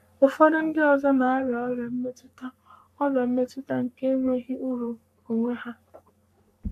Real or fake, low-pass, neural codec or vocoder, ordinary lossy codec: fake; 14.4 kHz; codec, 44.1 kHz, 3.4 kbps, Pupu-Codec; none